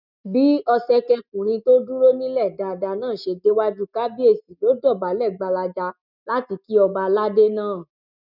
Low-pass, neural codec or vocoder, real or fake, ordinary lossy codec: 5.4 kHz; none; real; none